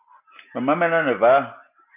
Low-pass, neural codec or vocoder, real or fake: 3.6 kHz; none; real